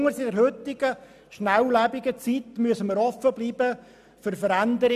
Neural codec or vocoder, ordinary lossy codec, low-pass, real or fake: none; none; 14.4 kHz; real